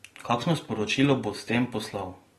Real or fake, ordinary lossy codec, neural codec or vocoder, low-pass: real; AAC, 32 kbps; none; 19.8 kHz